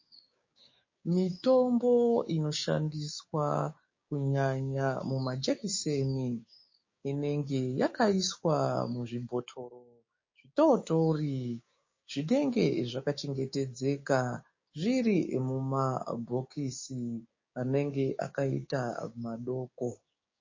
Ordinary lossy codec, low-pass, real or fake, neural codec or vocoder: MP3, 32 kbps; 7.2 kHz; fake; codec, 16 kHz, 6 kbps, DAC